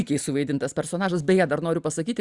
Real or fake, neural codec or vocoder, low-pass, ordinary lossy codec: real; none; 10.8 kHz; Opus, 64 kbps